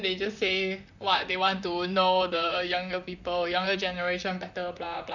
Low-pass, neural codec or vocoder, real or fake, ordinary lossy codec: 7.2 kHz; vocoder, 44.1 kHz, 128 mel bands, Pupu-Vocoder; fake; none